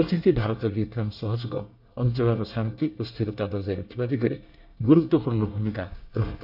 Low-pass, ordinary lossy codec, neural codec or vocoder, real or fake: 5.4 kHz; none; codec, 24 kHz, 1 kbps, SNAC; fake